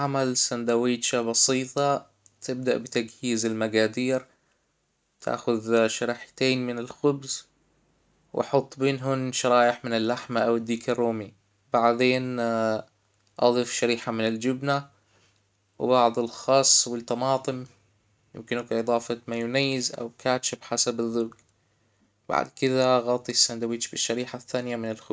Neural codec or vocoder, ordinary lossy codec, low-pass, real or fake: none; none; none; real